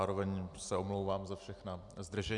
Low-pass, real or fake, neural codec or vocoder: 10.8 kHz; real; none